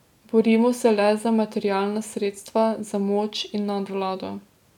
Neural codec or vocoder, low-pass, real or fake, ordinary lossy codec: none; 19.8 kHz; real; none